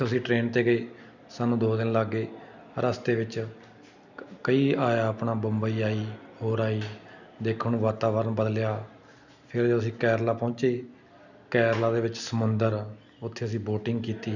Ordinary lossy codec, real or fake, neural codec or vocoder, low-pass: none; real; none; 7.2 kHz